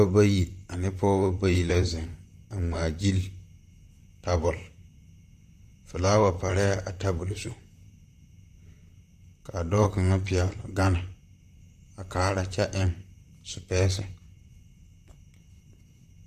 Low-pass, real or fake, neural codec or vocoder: 14.4 kHz; fake; vocoder, 44.1 kHz, 128 mel bands, Pupu-Vocoder